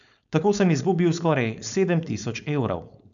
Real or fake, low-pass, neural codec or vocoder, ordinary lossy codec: fake; 7.2 kHz; codec, 16 kHz, 4.8 kbps, FACodec; none